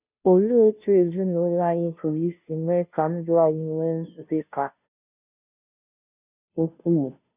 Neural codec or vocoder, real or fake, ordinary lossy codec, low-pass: codec, 16 kHz, 0.5 kbps, FunCodec, trained on Chinese and English, 25 frames a second; fake; none; 3.6 kHz